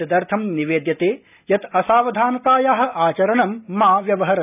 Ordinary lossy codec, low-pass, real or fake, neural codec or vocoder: none; 3.6 kHz; real; none